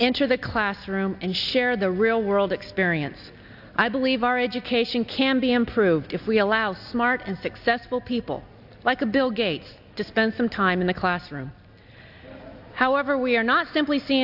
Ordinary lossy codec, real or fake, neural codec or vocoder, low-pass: AAC, 48 kbps; real; none; 5.4 kHz